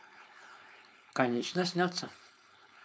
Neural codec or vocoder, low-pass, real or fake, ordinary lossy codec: codec, 16 kHz, 4.8 kbps, FACodec; none; fake; none